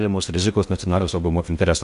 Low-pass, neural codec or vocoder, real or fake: 10.8 kHz; codec, 16 kHz in and 24 kHz out, 0.6 kbps, FocalCodec, streaming, 4096 codes; fake